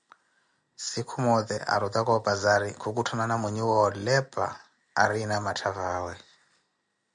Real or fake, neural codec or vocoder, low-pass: real; none; 9.9 kHz